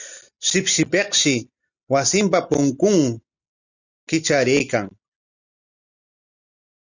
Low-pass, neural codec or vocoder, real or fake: 7.2 kHz; none; real